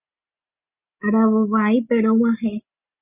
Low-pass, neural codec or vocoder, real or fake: 3.6 kHz; none; real